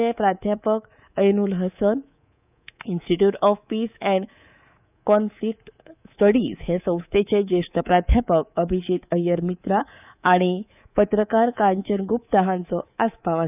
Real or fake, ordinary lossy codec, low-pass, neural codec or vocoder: fake; none; 3.6 kHz; codec, 24 kHz, 3.1 kbps, DualCodec